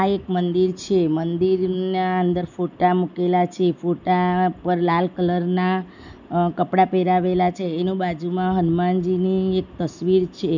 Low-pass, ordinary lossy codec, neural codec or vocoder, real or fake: 7.2 kHz; none; none; real